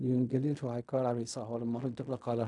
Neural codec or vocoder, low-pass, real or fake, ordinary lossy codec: codec, 16 kHz in and 24 kHz out, 0.4 kbps, LongCat-Audio-Codec, fine tuned four codebook decoder; 10.8 kHz; fake; none